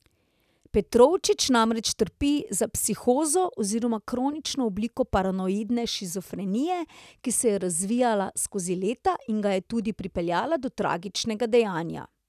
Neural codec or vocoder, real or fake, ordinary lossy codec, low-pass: none; real; none; 14.4 kHz